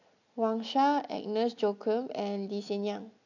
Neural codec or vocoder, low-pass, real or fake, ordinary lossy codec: none; 7.2 kHz; real; none